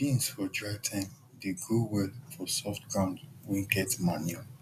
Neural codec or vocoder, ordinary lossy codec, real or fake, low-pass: none; none; real; 14.4 kHz